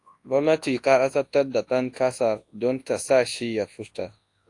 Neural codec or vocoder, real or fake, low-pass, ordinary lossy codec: codec, 24 kHz, 0.9 kbps, WavTokenizer, large speech release; fake; 10.8 kHz; AAC, 48 kbps